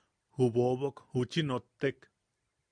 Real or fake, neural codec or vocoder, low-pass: real; none; 9.9 kHz